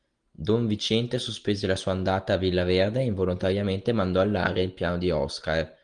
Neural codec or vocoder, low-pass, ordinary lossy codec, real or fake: none; 9.9 kHz; Opus, 24 kbps; real